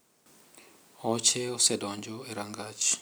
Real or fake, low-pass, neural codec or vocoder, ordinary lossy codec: real; none; none; none